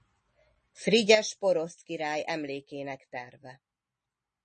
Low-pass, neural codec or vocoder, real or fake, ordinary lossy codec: 10.8 kHz; none; real; MP3, 32 kbps